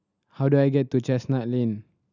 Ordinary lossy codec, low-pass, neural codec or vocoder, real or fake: none; 7.2 kHz; none; real